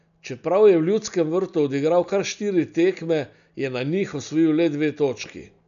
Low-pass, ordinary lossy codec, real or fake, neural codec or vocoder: 7.2 kHz; none; real; none